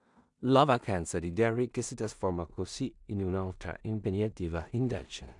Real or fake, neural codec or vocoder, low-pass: fake; codec, 16 kHz in and 24 kHz out, 0.4 kbps, LongCat-Audio-Codec, two codebook decoder; 10.8 kHz